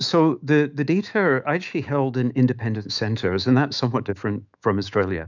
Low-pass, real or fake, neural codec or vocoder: 7.2 kHz; fake; autoencoder, 48 kHz, 128 numbers a frame, DAC-VAE, trained on Japanese speech